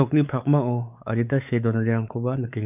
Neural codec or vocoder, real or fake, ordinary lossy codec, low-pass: codec, 16 kHz, 4 kbps, X-Codec, WavLM features, trained on Multilingual LibriSpeech; fake; none; 3.6 kHz